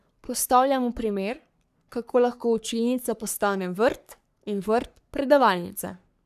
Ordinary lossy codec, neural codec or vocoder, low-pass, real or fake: none; codec, 44.1 kHz, 3.4 kbps, Pupu-Codec; 14.4 kHz; fake